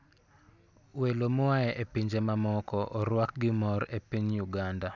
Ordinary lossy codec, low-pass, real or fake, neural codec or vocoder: none; 7.2 kHz; real; none